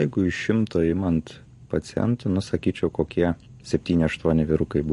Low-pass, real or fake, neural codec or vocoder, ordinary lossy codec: 14.4 kHz; real; none; MP3, 48 kbps